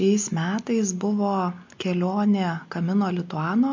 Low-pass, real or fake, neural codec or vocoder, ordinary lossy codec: 7.2 kHz; real; none; MP3, 48 kbps